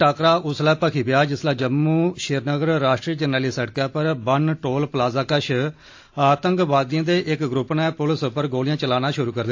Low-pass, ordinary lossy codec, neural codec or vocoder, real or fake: 7.2 kHz; AAC, 48 kbps; none; real